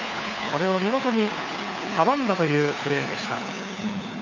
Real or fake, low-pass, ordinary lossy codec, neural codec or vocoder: fake; 7.2 kHz; none; codec, 16 kHz, 4 kbps, FunCodec, trained on LibriTTS, 50 frames a second